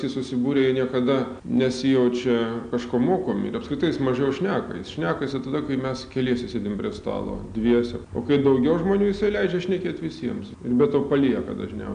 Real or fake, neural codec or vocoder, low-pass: real; none; 9.9 kHz